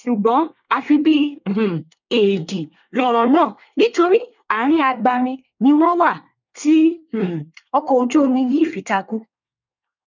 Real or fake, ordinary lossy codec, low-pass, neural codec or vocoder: fake; none; 7.2 kHz; codec, 24 kHz, 1 kbps, SNAC